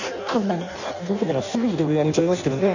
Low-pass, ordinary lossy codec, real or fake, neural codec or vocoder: 7.2 kHz; none; fake; codec, 16 kHz in and 24 kHz out, 0.6 kbps, FireRedTTS-2 codec